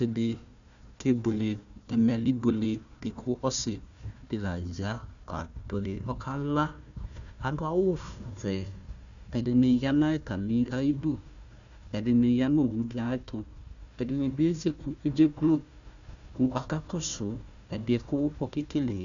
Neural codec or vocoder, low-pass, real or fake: codec, 16 kHz, 1 kbps, FunCodec, trained on Chinese and English, 50 frames a second; 7.2 kHz; fake